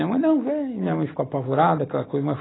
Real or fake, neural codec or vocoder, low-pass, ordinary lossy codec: real; none; 7.2 kHz; AAC, 16 kbps